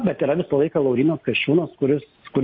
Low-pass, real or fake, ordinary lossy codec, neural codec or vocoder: 7.2 kHz; real; MP3, 32 kbps; none